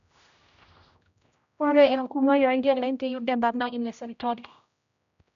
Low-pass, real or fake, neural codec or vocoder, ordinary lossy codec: 7.2 kHz; fake; codec, 16 kHz, 0.5 kbps, X-Codec, HuBERT features, trained on general audio; none